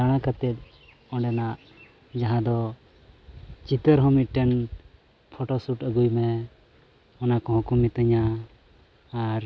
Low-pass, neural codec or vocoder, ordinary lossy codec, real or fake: none; none; none; real